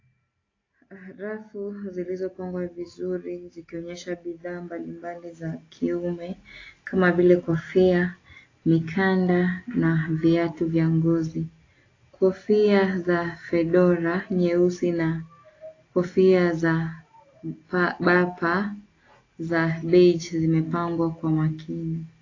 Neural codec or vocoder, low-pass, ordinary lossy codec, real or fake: none; 7.2 kHz; AAC, 32 kbps; real